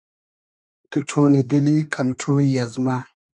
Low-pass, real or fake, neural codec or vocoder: 10.8 kHz; fake; codec, 24 kHz, 1 kbps, SNAC